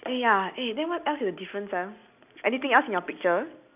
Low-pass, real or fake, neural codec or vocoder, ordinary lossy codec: 3.6 kHz; real; none; none